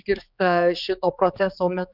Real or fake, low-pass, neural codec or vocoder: fake; 5.4 kHz; codec, 16 kHz, 4 kbps, X-Codec, HuBERT features, trained on general audio